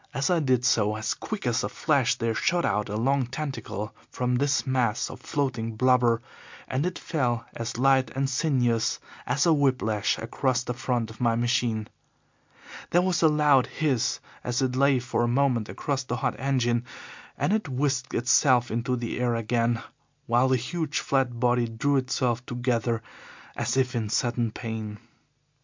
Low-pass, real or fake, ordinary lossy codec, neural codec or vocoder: 7.2 kHz; real; MP3, 64 kbps; none